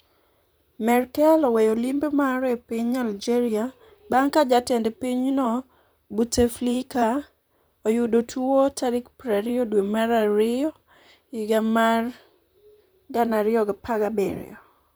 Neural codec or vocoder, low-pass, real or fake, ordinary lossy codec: vocoder, 44.1 kHz, 128 mel bands, Pupu-Vocoder; none; fake; none